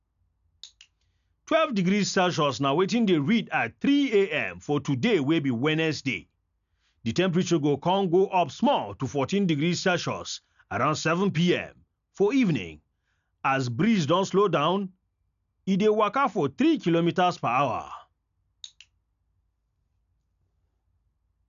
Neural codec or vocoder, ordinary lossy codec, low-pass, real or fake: none; none; 7.2 kHz; real